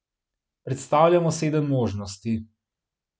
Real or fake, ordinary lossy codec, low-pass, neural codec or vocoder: real; none; none; none